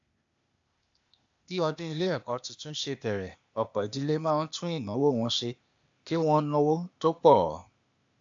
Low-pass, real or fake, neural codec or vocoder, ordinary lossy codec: 7.2 kHz; fake; codec, 16 kHz, 0.8 kbps, ZipCodec; AAC, 64 kbps